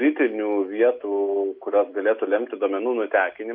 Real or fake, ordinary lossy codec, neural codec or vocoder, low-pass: real; MP3, 48 kbps; none; 5.4 kHz